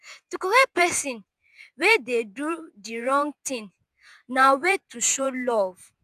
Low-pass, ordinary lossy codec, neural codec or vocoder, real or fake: 14.4 kHz; none; vocoder, 48 kHz, 128 mel bands, Vocos; fake